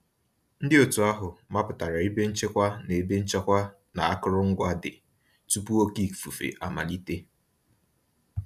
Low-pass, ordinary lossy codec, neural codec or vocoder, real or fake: 14.4 kHz; none; none; real